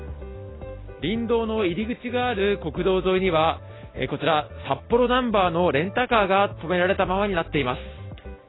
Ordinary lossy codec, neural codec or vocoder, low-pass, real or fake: AAC, 16 kbps; none; 7.2 kHz; real